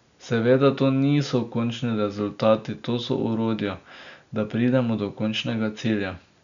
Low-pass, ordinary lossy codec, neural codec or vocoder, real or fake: 7.2 kHz; none; none; real